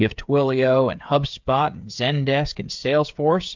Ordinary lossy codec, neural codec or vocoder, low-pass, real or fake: MP3, 64 kbps; codec, 16 kHz, 8 kbps, FreqCodec, smaller model; 7.2 kHz; fake